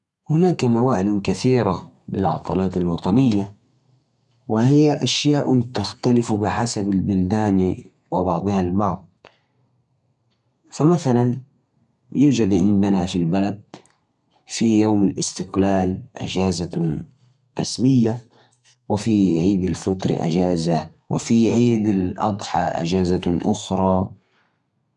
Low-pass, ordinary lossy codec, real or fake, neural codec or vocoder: 10.8 kHz; none; fake; codec, 32 kHz, 1.9 kbps, SNAC